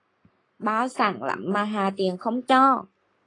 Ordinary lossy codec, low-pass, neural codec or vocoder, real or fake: AAC, 32 kbps; 10.8 kHz; codec, 44.1 kHz, 7.8 kbps, Pupu-Codec; fake